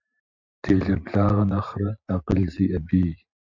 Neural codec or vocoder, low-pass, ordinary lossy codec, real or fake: vocoder, 44.1 kHz, 128 mel bands every 512 samples, BigVGAN v2; 7.2 kHz; MP3, 64 kbps; fake